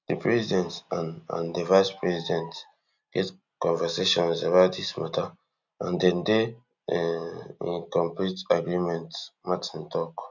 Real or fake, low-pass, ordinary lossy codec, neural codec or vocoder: real; 7.2 kHz; none; none